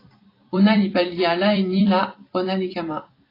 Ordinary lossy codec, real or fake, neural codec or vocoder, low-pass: AAC, 24 kbps; fake; vocoder, 44.1 kHz, 128 mel bands every 256 samples, BigVGAN v2; 5.4 kHz